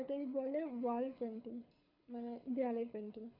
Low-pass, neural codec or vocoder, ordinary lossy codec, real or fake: 5.4 kHz; codec, 24 kHz, 6 kbps, HILCodec; none; fake